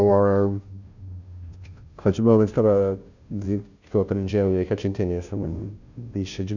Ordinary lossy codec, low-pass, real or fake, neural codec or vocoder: none; 7.2 kHz; fake; codec, 16 kHz, 0.5 kbps, FunCodec, trained on Chinese and English, 25 frames a second